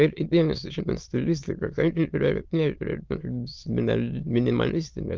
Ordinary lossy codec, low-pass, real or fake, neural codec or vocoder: Opus, 24 kbps; 7.2 kHz; fake; autoencoder, 22.05 kHz, a latent of 192 numbers a frame, VITS, trained on many speakers